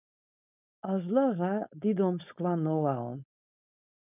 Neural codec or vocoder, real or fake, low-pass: codec, 16 kHz, 4.8 kbps, FACodec; fake; 3.6 kHz